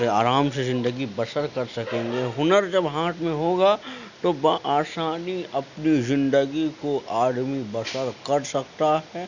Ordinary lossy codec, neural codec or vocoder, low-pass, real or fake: none; none; 7.2 kHz; real